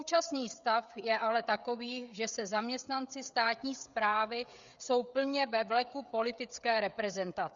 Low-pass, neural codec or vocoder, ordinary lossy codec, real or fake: 7.2 kHz; codec, 16 kHz, 16 kbps, FreqCodec, smaller model; Opus, 64 kbps; fake